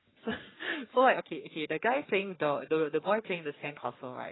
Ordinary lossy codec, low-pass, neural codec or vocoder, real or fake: AAC, 16 kbps; 7.2 kHz; codec, 44.1 kHz, 3.4 kbps, Pupu-Codec; fake